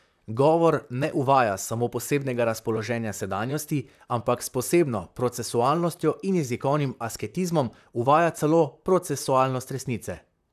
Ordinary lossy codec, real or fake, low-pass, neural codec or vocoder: none; fake; 14.4 kHz; vocoder, 44.1 kHz, 128 mel bands, Pupu-Vocoder